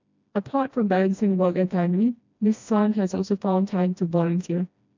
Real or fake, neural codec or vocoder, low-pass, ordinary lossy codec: fake; codec, 16 kHz, 1 kbps, FreqCodec, smaller model; 7.2 kHz; MP3, 64 kbps